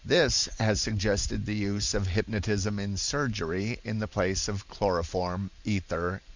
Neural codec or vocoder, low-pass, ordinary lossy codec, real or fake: vocoder, 44.1 kHz, 128 mel bands every 512 samples, BigVGAN v2; 7.2 kHz; Opus, 64 kbps; fake